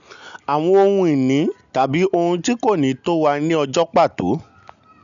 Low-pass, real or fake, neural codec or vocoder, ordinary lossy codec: 7.2 kHz; real; none; none